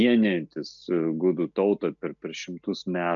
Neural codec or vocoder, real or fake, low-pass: none; real; 7.2 kHz